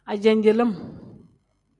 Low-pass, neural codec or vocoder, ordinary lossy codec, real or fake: 10.8 kHz; none; MP3, 96 kbps; real